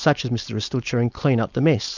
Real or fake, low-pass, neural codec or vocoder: fake; 7.2 kHz; codec, 16 kHz, 4.8 kbps, FACodec